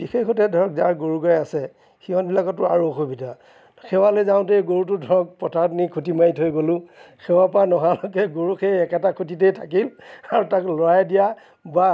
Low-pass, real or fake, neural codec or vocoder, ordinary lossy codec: none; real; none; none